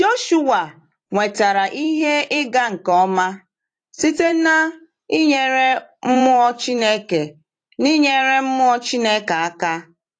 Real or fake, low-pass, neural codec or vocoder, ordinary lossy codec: fake; 9.9 kHz; vocoder, 44.1 kHz, 128 mel bands every 256 samples, BigVGAN v2; AAC, 48 kbps